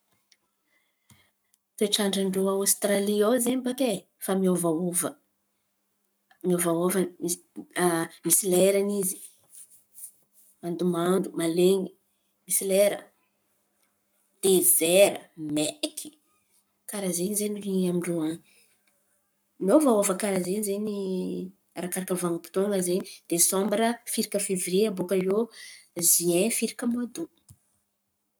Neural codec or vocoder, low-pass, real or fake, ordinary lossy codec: codec, 44.1 kHz, 7.8 kbps, Pupu-Codec; none; fake; none